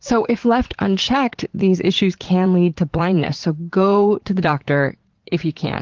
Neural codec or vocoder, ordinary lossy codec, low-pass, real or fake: vocoder, 44.1 kHz, 80 mel bands, Vocos; Opus, 16 kbps; 7.2 kHz; fake